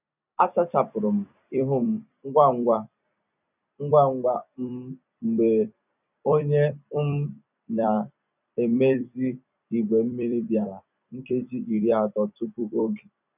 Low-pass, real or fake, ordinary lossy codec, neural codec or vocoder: 3.6 kHz; fake; none; vocoder, 44.1 kHz, 128 mel bands every 256 samples, BigVGAN v2